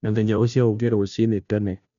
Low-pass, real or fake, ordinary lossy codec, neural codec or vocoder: 7.2 kHz; fake; none; codec, 16 kHz, 0.5 kbps, FunCodec, trained on Chinese and English, 25 frames a second